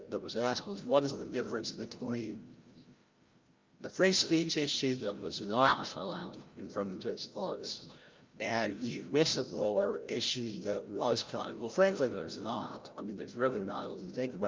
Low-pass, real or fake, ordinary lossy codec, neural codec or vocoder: 7.2 kHz; fake; Opus, 24 kbps; codec, 16 kHz, 0.5 kbps, FreqCodec, larger model